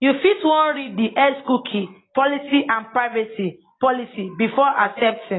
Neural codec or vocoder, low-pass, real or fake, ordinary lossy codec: none; 7.2 kHz; real; AAC, 16 kbps